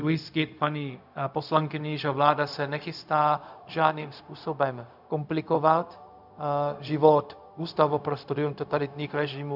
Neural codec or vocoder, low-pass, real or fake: codec, 16 kHz, 0.4 kbps, LongCat-Audio-Codec; 5.4 kHz; fake